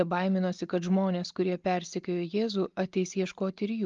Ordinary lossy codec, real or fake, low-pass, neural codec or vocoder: Opus, 32 kbps; real; 7.2 kHz; none